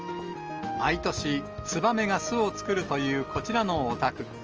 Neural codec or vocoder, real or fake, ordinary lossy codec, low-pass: none; real; Opus, 24 kbps; 7.2 kHz